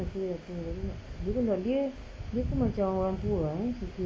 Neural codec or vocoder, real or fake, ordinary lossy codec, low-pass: none; real; none; none